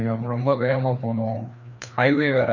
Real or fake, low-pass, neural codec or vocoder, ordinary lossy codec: fake; 7.2 kHz; codec, 16 kHz, 2 kbps, FreqCodec, larger model; none